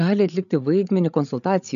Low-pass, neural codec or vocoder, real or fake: 7.2 kHz; codec, 16 kHz, 8 kbps, FreqCodec, larger model; fake